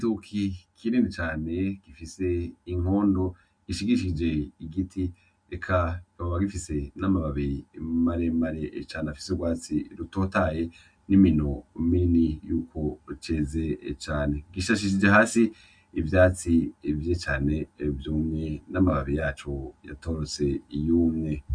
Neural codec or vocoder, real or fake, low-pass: none; real; 9.9 kHz